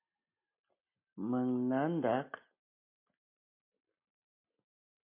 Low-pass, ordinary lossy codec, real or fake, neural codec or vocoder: 3.6 kHz; MP3, 32 kbps; real; none